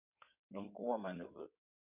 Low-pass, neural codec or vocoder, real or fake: 3.6 kHz; codec, 16 kHz in and 24 kHz out, 2.2 kbps, FireRedTTS-2 codec; fake